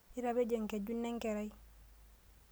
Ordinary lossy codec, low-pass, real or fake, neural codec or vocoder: none; none; real; none